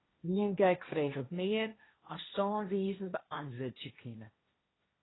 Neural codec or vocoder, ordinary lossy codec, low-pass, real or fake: codec, 16 kHz, 1.1 kbps, Voila-Tokenizer; AAC, 16 kbps; 7.2 kHz; fake